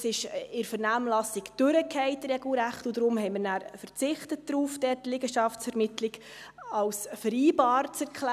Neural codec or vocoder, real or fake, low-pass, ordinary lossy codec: none; real; 14.4 kHz; none